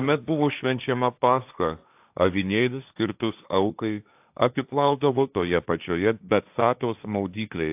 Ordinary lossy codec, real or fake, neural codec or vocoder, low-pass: AAC, 32 kbps; fake; codec, 16 kHz, 1.1 kbps, Voila-Tokenizer; 3.6 kHz